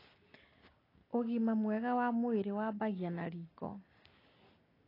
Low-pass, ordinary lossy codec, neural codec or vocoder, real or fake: 5.4 kHz; AAC, 24 kbps; none; real